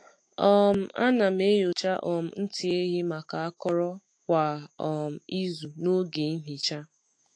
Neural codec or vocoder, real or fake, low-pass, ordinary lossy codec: autoencoder, 48 kHz, 128 numbers a frame, DAC-VAE, trained on Japanese speech; fake; 9.9 kHz; AAC, 48 kbps